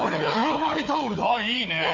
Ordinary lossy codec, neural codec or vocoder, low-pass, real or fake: AAC, 48 kbps; codec, 16 kHz, 4 kbps, FunCodec, trained on Chinese and English, 50 frames a second; 7.2 kHz; fake